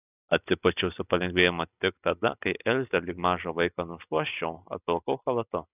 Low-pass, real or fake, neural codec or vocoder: 3.6 kHz; real; none